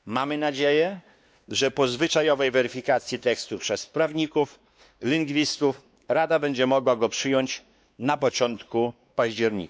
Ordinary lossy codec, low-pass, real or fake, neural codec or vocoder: none; none; fake; codec, 16 kHz, 2 kbps, X-Codec, WavLM features, trained on Multilingual LibriSpeech